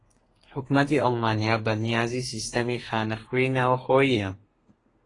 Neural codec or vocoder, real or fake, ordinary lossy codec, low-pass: codec, 32 kHz, 1.9 kbps, SNAC; fake; AAC, 32 kbps; 10.8 kHz